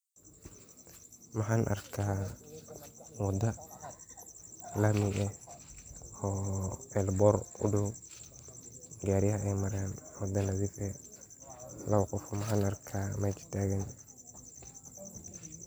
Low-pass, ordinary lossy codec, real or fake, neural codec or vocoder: none; none; real; none